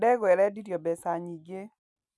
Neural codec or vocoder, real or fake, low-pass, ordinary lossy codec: none; real; none; none